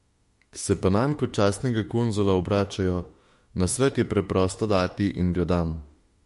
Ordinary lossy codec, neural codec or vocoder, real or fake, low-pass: MP3, 48 kbps; autoencoder, 48 kHz, 32 numbers a frame, DAC-VAE, trained on Japanese speech; fake; 14.4 kHz